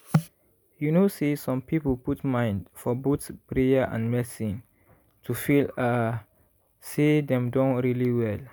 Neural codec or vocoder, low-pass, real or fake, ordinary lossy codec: none; none; real; none